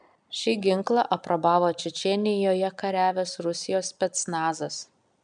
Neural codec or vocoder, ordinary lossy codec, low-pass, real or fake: none; MP3, 96 kbps; 9.9 kHz; real